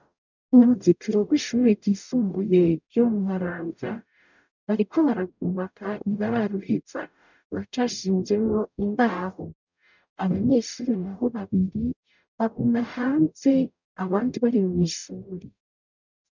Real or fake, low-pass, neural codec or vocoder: fake; 7.2 kHz; codec, 44.1 kHz, 0.9 kbps, DAC